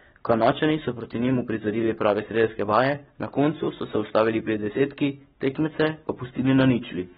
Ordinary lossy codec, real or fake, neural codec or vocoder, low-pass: AAC, 16 kbps; fake; codec, 44.1 kHz, 7.8 kbps, Pupu-Codec; 19.8 kHz